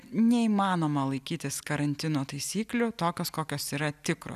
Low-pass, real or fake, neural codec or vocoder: 14.4 kHz; real; none